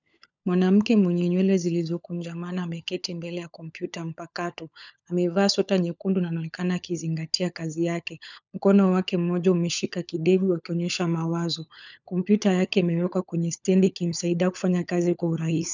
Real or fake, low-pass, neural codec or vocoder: fake; 7.2 kHz; codec, 16 kHz, 16 kbps, FunCodec, trained on LibriTTS, 50 frames a second